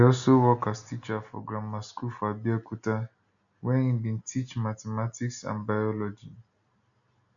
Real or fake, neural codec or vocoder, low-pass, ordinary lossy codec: real; none; 7.2 kHz; none